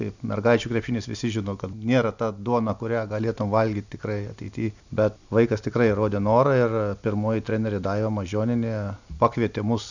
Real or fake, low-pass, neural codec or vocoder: real; 7.2 kHz; none